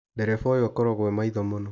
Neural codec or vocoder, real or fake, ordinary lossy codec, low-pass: none; real; none; none